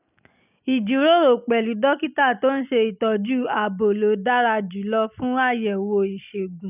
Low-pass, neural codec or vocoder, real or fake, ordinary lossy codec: 3.6 kHz; none; real; none